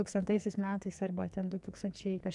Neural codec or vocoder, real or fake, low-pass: codec, 44.1 kHz, 2.6 kbps, SNAC; fake; 10.8 kHz